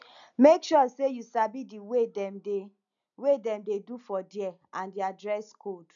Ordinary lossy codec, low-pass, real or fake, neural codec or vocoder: none; 7.2 kHz; real; none